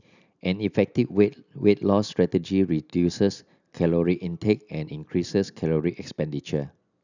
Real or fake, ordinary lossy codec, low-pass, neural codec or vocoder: real; none; 7.2 kHz; none